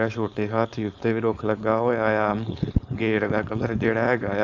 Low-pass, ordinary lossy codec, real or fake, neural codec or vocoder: 7.2 kHz; none; fake; codec, 16 kHz, 4.8 kbps, FACodec